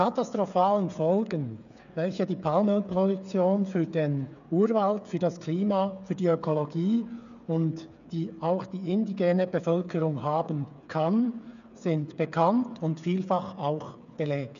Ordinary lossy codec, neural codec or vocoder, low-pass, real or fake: none; codec, 16 kHz, 8 kbps, FreqCodec, smaller model; 7.2 kHz; fake